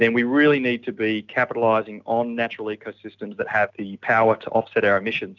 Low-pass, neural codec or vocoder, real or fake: 7.2 kHz; none; real